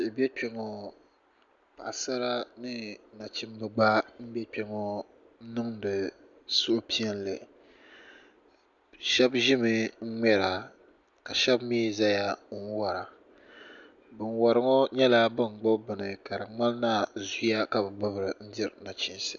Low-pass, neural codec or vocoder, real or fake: 7.2 kHz; none; real